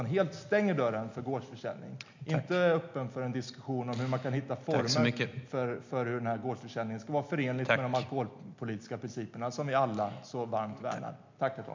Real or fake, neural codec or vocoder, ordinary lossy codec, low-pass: real; none; MP3, 64 kbps; 7.2 kHz